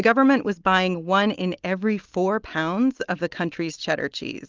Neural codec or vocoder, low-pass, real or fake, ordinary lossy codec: none; 7.2 kHz; real; Opus, 32 kbps